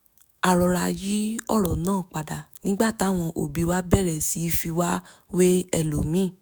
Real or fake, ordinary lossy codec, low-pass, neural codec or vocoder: fake; none; none; autoencoder, 48 kHz, 128 numbers a frame, DAC-VAE, trained on Japanese speech